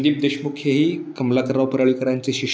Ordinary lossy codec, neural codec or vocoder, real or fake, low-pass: none; none; real; none